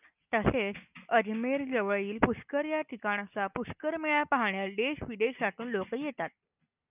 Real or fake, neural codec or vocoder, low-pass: real; none; 3.6 kHz